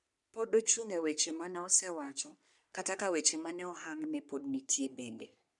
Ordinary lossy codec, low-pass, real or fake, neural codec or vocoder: none; 10.8 kHz; fake; codec, 44.1 kHz, 3.4 kbps, Pupu-Codec